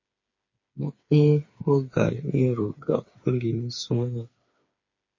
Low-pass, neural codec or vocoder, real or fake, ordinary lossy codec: 7.2 kHz; codec, 16 kHz, 4 kbps, FreqCodec, smaller model; fake; MP3, 32 kbps